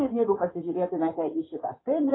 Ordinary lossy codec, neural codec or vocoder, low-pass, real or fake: AAC, 16 kbps; codec, 16 kHz in and 24 kHz out, 2.2 kbps, FireRedTTS-2 codec; 7.2 kHz; fake